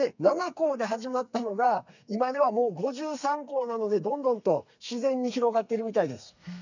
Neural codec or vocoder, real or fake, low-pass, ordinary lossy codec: codec, 32 kHz, 1.9 kbps, SNAC; fake; 7.2 kHz; none